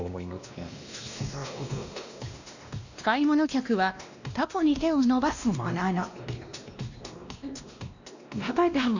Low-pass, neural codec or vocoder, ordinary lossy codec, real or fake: 7.2 kHz; codec, 16 kHz, 1 kbps, X-Codec, WavLM features, trained on Multilingual LibriSpeech; none; fake